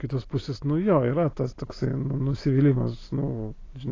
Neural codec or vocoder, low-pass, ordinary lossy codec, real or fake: none; 7.2 kHz; AAC, 32 kbps; real